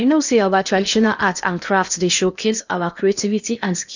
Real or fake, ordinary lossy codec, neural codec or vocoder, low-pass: fake; none; codec, 16 kHz in and 24 kHz out, 0.8 kbps, FocalCodec, streaming, 65536 codes; 7.2 kHz